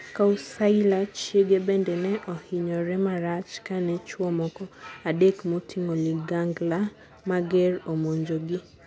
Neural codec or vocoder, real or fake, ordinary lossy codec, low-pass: none; real; none; none